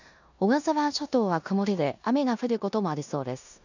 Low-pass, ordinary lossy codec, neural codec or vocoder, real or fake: 7.2 kHz; none; codec, 16 kHz in and 24 kHz out, 0.9 kbps, LongCat-Audio-Codec, four codebook decoder; fake